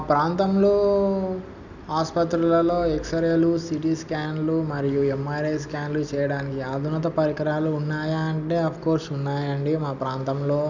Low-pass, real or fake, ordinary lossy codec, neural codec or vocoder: 7.2 kHz; real; none; none